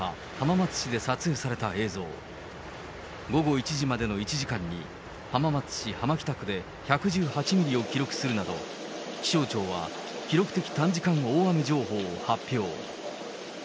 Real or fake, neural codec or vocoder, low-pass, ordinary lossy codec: real; none; none; none